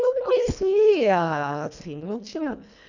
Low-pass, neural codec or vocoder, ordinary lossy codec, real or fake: 7.2 kHz; codec, 24 kHz, 1.5 kbps, HILCodec; none; fake